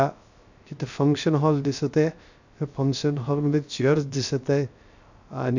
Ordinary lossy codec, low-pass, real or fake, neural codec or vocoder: none; 7.2 kHz; fake; codec, 16 kHz, 0.3 kbps, FocalCodec